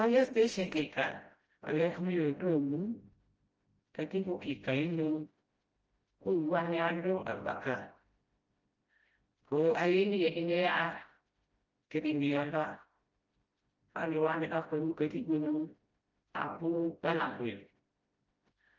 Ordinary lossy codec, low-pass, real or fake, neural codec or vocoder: Opus, 24 kbps; 7.2 kHz; fake; codec, 16 kHz, 0.5 kbps, FreqCodec, smaller model